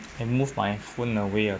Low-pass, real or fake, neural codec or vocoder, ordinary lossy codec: none; real; none; none